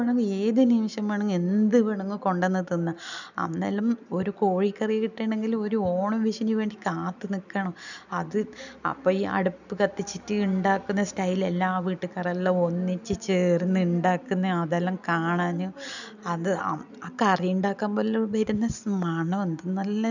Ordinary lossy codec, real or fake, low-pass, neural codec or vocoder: none; real; 7.2 kHz; none